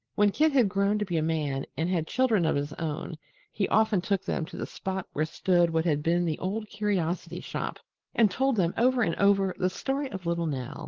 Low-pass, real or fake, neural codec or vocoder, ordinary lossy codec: 7.2 kHz; real; none; Opus, 16 kbps